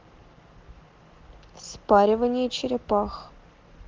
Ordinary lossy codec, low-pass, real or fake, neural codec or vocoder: Opus, 16 kbps; 7.2 kHz; real; none